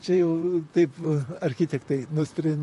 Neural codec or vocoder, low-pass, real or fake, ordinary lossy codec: vocoder, 44.1 kHz, 128 mel bands, Pupu-Vocoder; 14.4 kHz; fake; MP3, 48 kbps